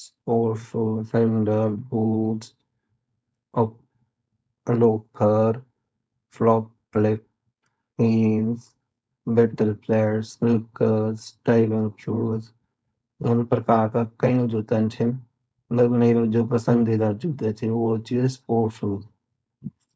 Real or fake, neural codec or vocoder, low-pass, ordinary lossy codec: fake; codec, 16 kHz, 4.8 kbps, FACodec; none; none